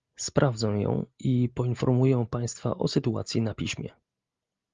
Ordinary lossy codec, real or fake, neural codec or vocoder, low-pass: Opus, 32 kbps; real; none; 7.2 kHz